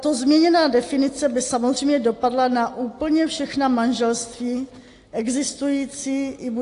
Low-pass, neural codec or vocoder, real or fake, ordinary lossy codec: 10.8 kHz; none; real; AAC, 48 kbps